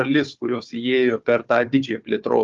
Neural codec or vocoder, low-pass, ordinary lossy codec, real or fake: codec, 16 kHz, 4 kbps, FunCodec, trained on Chinese and English, 50 frames a second; 7.2 kHz; Opus, 32 kbps; fake